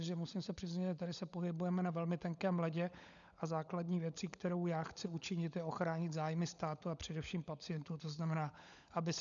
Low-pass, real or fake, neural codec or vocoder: 7.2 kHz; fake; codec, 16 kHz, 16 kbps, FunCodec, trained on LibriTTS, 50 frames a second